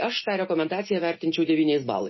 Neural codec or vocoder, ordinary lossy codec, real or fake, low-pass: none; MP3, 24 kbps; real; 7.2 kHz